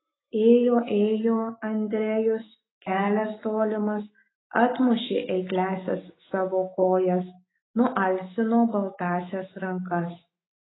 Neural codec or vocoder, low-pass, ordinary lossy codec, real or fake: autoencoder, 48 kHz, 128 numbers a frame, DAC-VAE, trained on Japanese speech; 7.2 kHz; AAC, 16 kbps; fake